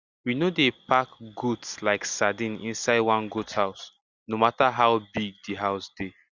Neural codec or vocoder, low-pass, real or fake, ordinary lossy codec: none; 7.2 kHz; real; Opus, 64 kbps